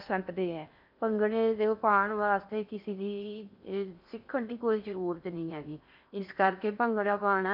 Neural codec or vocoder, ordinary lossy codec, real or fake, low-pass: codec, 16 kHz in and 24 kHz out, 0.6 kbps, FocalCodec, streaming, 4096 codes; none; fake; 5.4 kHz